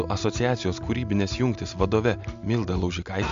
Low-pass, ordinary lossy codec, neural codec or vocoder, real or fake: 7.2 kHz; MP3, 64 kbps; none; real